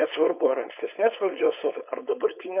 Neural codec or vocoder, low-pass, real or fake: codec, 16 kHz, 4.8 kbps, FACodec; 3.6 kHz; fake